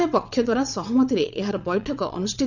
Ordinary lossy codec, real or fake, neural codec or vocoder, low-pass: none; fake; vocoder, 22.05 kHz, 80 mel bands, WaveNeXt; 7.2 kHz